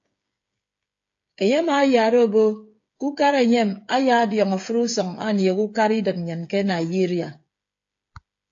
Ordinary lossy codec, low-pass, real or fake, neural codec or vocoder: AAC, 48 kbps; 7.2 kHz; fake; codec, 16 kHz, 8 kbps, FreqCodec, smaller model